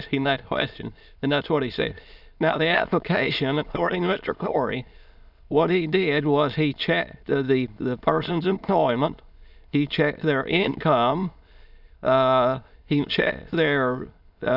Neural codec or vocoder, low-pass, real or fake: autoencoder, 22.05 kHz, a latent of 192 numbers a frame, VITS, trained on many speakers; 5.4 kHz; fake